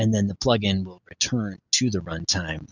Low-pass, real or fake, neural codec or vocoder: 7.2 kHz; real; none